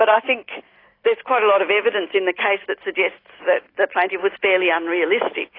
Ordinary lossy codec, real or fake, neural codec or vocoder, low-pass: AAC, 24 kbps; real; none; 5.4 kHz